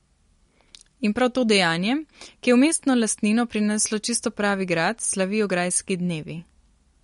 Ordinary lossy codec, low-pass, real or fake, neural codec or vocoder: MP3, 48 kbps; 10.8 kHz; real; none